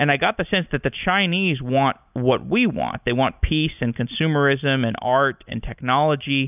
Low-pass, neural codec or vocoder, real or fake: 3.6 kHz; none; real